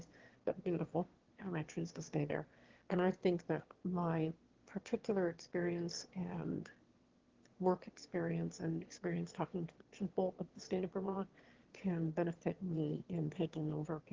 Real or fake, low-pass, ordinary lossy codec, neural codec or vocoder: fake; 7.2 kHz; Opus, 16 kbps; autoencoder, 22.05 kHz, a latent of 192 numbers a frame, VITS, trained on one speaker